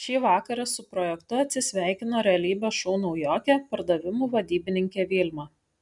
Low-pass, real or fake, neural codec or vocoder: 10.8 kHz; real; none